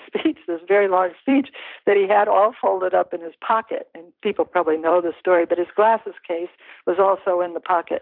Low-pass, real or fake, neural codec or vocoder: 5.4 kHz; fake; vocoder, 44.1 kHz, 128 mel bands every 256 samples, BigVGAN v2